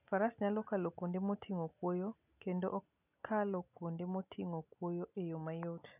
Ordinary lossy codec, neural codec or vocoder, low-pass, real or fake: none; none; 3.6 kHz; real